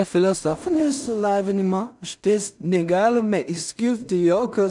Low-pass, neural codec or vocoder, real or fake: 10.8 kHz; codec, 16 kHz in and 24 kHz out, 0.4 kbps, LongCat-Audio-Codec, two codebook decoder; fake